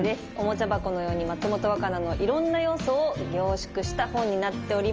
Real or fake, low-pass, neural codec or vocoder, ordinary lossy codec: real; 7.2 kHz; none; Opus, 24 kbps